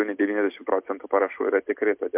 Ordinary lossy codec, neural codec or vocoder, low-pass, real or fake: MP3, 32 kbps; none; 3.6 kHz; real